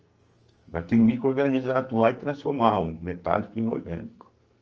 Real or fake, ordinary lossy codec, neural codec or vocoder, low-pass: fake; Opus, 24 kbps; codec, 44.1 kHz, 2.6 kbps, SNAC; 7.2 kHz